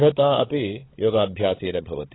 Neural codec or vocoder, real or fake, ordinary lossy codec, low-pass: codec, 16 kHz, 16 kbps, FunCodec, trained on LibriTTS, 50 frames a second; fake; AAC, 16 kbps; 7.2 kHz